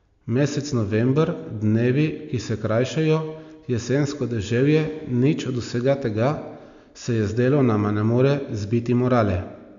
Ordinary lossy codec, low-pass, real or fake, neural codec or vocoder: MP3, 64 kbps; 7.2 kHz; real; none